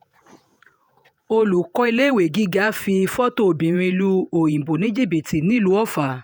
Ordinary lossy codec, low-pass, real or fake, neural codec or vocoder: none; none; fake; vocoder, 48 kHz, 128 mel bands, Vocos